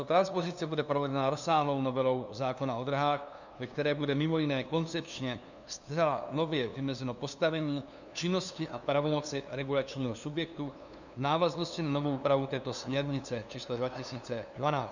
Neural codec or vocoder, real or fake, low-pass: codec, 16 kHz, 2 kbps, FunCodec, trained on LibriTTS, 25 frames a second; fake; 7.2 kHz